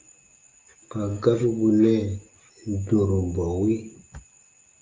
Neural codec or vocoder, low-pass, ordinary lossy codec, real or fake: none; 7.2 kHz; Opus, 24 kbps; real